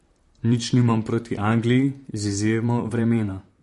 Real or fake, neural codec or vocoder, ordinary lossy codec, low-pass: fake; vocoder, 44.1 kHz, 128 mel bands, Pupu-Vocoder; MP3, 48 kbps; 14.4 kHz